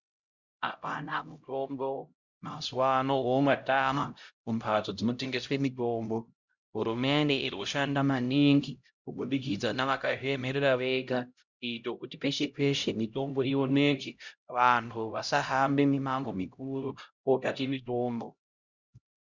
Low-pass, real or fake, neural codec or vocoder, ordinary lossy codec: 7.2 kHz; fake; codec, 16 kHz, 0.5 kbps, X-Codec, HuBERT features, trained on LibriSpeech; Opus, 64 kbps